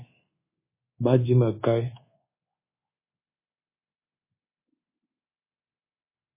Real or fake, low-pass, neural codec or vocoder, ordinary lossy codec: fake; 3.6 kHz; codec, 24 kHz, 1.2 kbps, DualCodec; MP3, 16 kbps